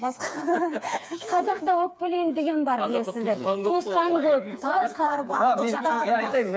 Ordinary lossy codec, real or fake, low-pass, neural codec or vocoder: none; fake; none; codec, 16 kHz, 4 kbps, FreqCodec, smaller model